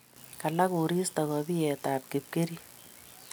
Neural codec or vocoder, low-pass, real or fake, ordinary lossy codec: none; none; real; none